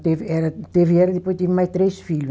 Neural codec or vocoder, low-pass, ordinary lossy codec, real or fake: none; none; none; real